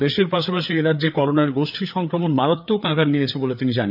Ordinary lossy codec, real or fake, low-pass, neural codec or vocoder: none; fake; 5.4 kHz; codec, 16 kHz, 4 kbps, FreqCodec, larger model